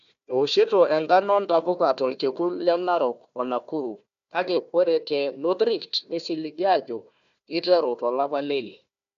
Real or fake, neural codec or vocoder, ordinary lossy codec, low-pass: fake; codec, 16 kHz, 1 kbps, FunCodec, trained on Chinese and English, 50 frames a second; AAC, 96 kbps; 7.2 kHz